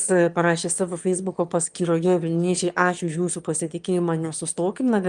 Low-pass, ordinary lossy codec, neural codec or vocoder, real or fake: 9.9 kHz; Opus, 32 kbps; autoencoder, 22.05 kHz, a latent of 192 numbers a frame, VITS, trained on one speaker; fake